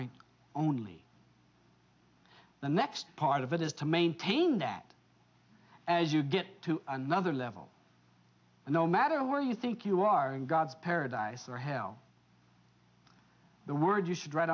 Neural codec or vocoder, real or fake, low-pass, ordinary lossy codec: none; real; 7.2 kHz; AAC, 48 kbps